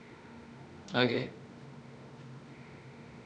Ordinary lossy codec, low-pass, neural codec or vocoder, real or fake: none; 9.9 kHz; autoencoder, 48 kHz, 32 numbers a frame, DAC-VAE, trained on Japanese speech; fake